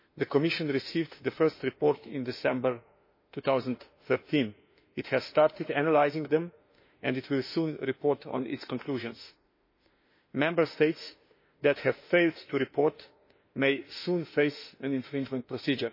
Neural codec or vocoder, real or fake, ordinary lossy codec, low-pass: autoencoder, 48 kHz, 32 numbers a frame, DAC-VAE, trained on Japanese speech; fake; MP3, 24 kbps; 5.4 kHz